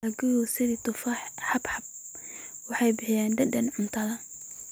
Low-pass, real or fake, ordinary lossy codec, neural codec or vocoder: none; real; none; none